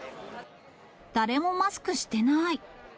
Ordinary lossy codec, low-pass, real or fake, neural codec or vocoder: none; none; real; none